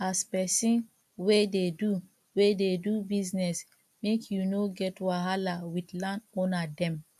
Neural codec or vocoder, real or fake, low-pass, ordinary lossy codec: none; real; 14.4 kHz; AAC, 96 kbps